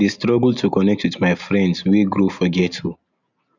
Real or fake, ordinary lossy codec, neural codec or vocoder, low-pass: real; none; none; 7.2 kHz